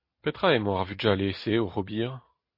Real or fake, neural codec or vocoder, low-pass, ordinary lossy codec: real; none; 5.4 kHz; MP3, 32 kbps